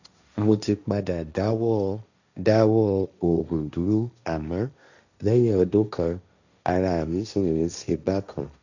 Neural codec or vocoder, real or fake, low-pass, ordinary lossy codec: codec, 16 kHz, 1.1 kbps, Voila-Tokenizer; fake; 7.2 kHz; none